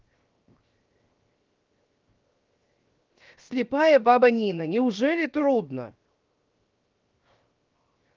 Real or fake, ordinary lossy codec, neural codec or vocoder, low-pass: fake; Opus, 24 kbps; codec, 16 kHz, 0.7 kbps, FocalCodec; 7.2 kHz